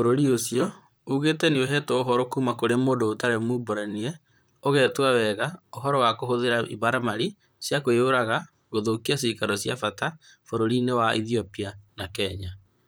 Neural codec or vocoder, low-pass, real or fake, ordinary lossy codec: vocoder, 44.1 kHz, 128 mel bands, Pupu-Vocoder; none; fake; none